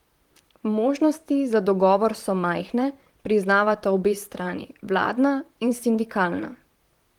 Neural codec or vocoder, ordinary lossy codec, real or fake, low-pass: vocoder, 44.1 kHz, 128 mel bands, Pupu-Vocoder; Opus, 24 kbps; fake; 19.8 kHz